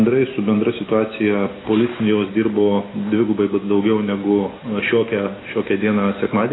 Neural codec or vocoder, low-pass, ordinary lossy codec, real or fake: none; 7.2 kHz; AAC, 16 kbps; real